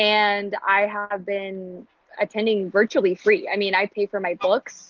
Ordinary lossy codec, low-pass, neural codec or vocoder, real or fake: Opus, 24 kbps; 7.2 kHz; none; real